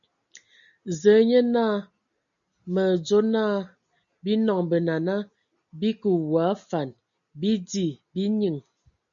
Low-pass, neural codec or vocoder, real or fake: 7.2 kHz; none; real